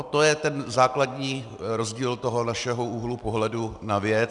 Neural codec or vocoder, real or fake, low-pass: none; real; 10.8 kHz